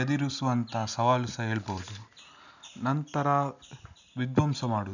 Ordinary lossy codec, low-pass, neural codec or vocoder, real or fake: none; 7.2 kHz; none; real